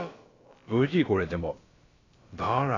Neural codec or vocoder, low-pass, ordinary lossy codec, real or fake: codec, 16 kHz, about 1 kbps, DyCAST, with the encoder's durations; 7.2 kHz; AAC, 32 kbps; fake